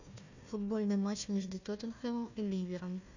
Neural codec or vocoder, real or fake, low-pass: codec, 16 kHz, 1 kbps, FunCodec, trained on Chinese and English, 50 frames a second; fake; 7.2 kHz